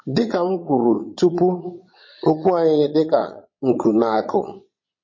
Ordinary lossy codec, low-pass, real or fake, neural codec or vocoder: MP3, 32 kbps; 7.2 kHz; fake; vocoder, 22.05 kHz, 80 mel bands, Vocos